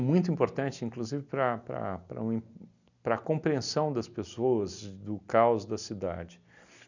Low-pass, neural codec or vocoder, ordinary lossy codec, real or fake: 7.2 kHz; none; none; real